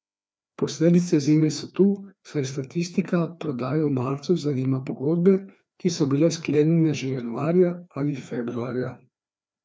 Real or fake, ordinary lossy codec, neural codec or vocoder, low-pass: fake; none; codec, 16 kHz, 2 kbps, FreqCodec, larger model; none